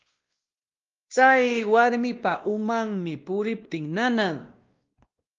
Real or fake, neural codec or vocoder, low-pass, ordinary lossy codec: fake; codec, 16 kHz, 1 kbps, X-Codec, WavLM features, trained on Multilingual LibriSpeech; 7.2 kHz; Opus, 32 kbps